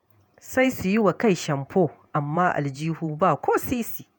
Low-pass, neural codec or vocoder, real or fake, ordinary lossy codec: none; vocoder, 48 kHz, 128 mel bands, Vocos; fake; none